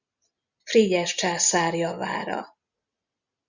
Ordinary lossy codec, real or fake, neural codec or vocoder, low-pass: Opus, 64 kbps; real; none; 7.2 kHz